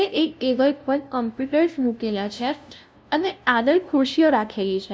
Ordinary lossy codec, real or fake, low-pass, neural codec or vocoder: none; fake; none; codec, 16 kHz, 0.5 kbps, FunCodec, trained on LibriTTS, 25 frames a second